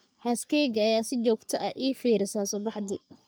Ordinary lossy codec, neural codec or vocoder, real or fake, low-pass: none; codec, 44.1 kHz, 3.4 kbps, Pupu-Codec; fake; none